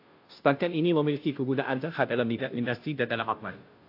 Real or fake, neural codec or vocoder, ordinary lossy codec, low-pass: fake; codec, 16 kHz, 0.5 kbps, FunCodec, trained on Chinese and English, 25 frames a second; AAC, 32 kbps; 5.4 kHz